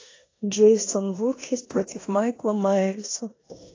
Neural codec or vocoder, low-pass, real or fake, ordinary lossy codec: codec, 16 kHz in and 24 kHz out, 0.9 kbps, LongCat-Audio-Codec, four codebook decoder; 7.2 kHz; fake; AAC, 32 kbps